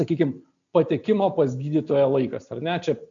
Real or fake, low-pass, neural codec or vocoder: real; 7.2 kHz; none